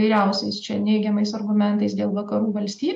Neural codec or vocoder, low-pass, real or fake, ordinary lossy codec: none; 9.9 kHz; real; MP3, 64 kbps